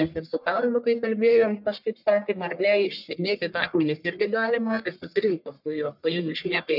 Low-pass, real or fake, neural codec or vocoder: 5.4 kHz; fake; codec, 44.1 kHz, 1.7 kbps, Pupu-Codec